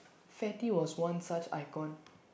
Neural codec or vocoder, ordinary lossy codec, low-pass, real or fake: none; none; none; real